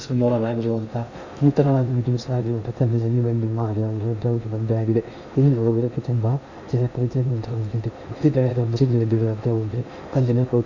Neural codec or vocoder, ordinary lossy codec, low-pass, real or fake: codec, 16 kHz in and 24 kHz out, 0.6 kbps, FocalCodec, streaming, 2048 codes; Opus, 64 kbps; 7.2 kHz; fake